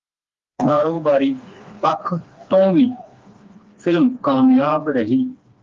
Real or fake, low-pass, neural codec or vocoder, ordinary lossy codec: fake; 7.2 kHz; codec, 16 kHz, 2 kbps, FreqCodec, smaller model; Opus, 32 kbps